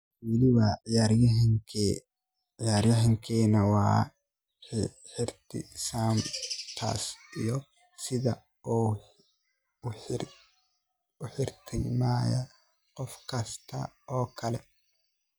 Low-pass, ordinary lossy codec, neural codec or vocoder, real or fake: none; none; none; real